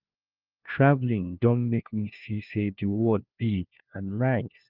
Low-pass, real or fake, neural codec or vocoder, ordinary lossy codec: 5.4 kHz; fake; codec, 16 kHz, 1 kbps, FunCodec, trained on LibriTTS, 50 frames a second; Opus, 24 kbps